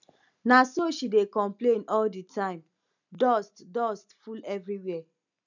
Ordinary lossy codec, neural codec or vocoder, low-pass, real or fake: none; none; 7.2 kHz; real